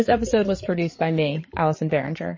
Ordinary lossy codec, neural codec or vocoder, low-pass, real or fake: MP3, 32 kbps; codec, 16 kHz, 8 kbps, FreqCodec, larger model; 7.2 kHz; fake